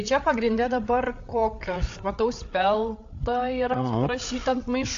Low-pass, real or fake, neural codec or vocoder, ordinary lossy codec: 7.2 kHz; fake; codec, 16 kHz, 8 kbps, FreqCodec, larger model; AAC, 48 kbps